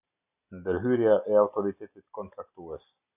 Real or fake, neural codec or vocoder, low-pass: real; none; 3.6 kHz